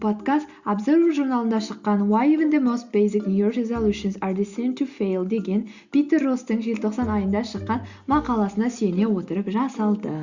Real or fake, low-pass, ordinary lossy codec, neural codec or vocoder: real; 7.2 kHz; Opus, 64 kbps; none